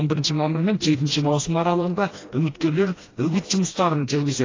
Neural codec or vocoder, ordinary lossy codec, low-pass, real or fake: codec, 16 kHz, 1 kbps, FreqCodec, smaller model; AAC, 32 kbps; 7.2 kHz; fake